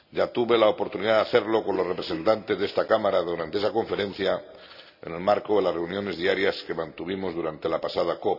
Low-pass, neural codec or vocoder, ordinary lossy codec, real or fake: 5.4 kHz; none; none; real